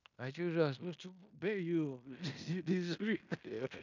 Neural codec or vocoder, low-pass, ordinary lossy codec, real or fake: codec, 16 kHz in and 24 kHz out, 0.9 kbps, LongCat-Audio-Codec, fine tuned four codebook decoder; 7.2 kHz; none; fake